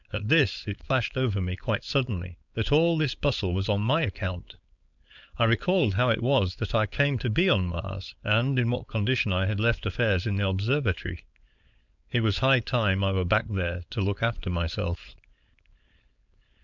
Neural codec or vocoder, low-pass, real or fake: codec, 16 kHz, 4.8 kbps, FACodec; 7.2 kHz; fake